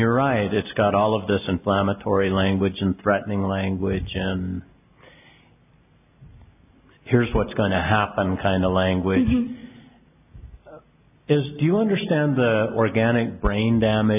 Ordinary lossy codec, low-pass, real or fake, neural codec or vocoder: AAC, 32 kbps; 3.6 kHz; real; none